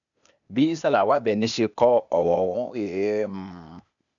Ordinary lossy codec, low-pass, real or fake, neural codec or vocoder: none; 7.2 kHz; fake; codec, 16 kHz, 0.8 kbps, ZipCodec